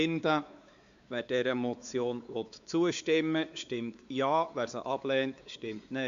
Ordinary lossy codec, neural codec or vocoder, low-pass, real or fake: Opus, 64 kbps; codec, 16 kHz, 4 kbps, X-Codec, WavLM features, trained on Multilingual LibriSpeech; 7.2 kHz; fake